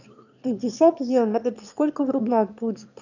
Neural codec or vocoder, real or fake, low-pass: autoencoder, 22.05 kHz, a latent of 192 numbers a frame, VITS, trained on one speaker; fake; 7.2 kHz